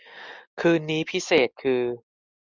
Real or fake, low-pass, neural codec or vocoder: real; 7.2 kHz; none